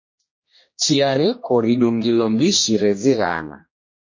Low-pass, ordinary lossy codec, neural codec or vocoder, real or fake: 7.2 kHz; MP3, 32 kbps; codec, 16 kHz, 1 kbps, X-Codec, HuBERT features, trained on general audio; fake